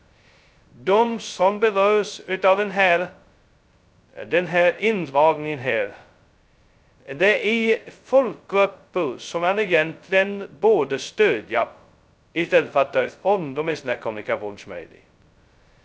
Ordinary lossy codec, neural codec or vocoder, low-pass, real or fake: none; codec, 16 kHz, 0.2 kbps, FocalCodec; none; fake